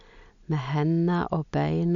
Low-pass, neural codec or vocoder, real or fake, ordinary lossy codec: 7.2 kHz; none; real; none